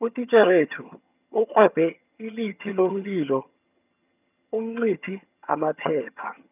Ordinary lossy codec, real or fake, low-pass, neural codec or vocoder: none; fake; 3.6 kHz; vocoder, 22.05 kHz, 80 mel bands, HiFi-GAN